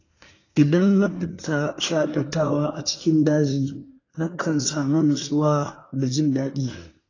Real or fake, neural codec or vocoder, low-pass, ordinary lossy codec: fake; codec, 24 kHz, 1 kbps, SNAC; 7.2 kHz; AAC, 32 kbps